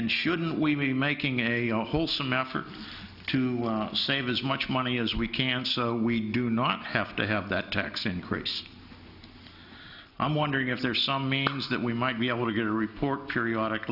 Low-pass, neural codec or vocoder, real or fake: 5.4 kHz; none; real